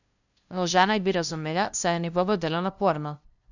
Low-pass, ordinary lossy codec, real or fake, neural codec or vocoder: 7.2 kHz; none; fake; codec, 16 kHz, 0.5 kbps, FunCodec, trained on LibriTTS, 25 frames a second